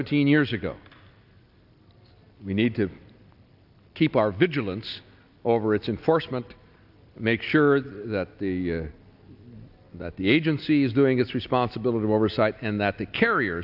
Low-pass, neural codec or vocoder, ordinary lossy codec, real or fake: 5.4 kHz; none; AAC, 48 kbps; real